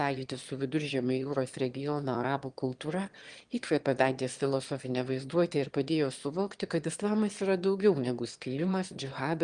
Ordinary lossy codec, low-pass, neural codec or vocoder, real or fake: Opus, 24 kbps; 9.9 kHz; autoencoder, 22.05 kHz, a latent of 192 numbers a frame, VITS, trained on one speaker; fake